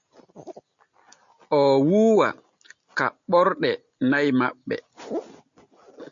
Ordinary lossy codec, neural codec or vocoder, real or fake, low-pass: MP3, 64 kbps; none; real; 7.2 kHz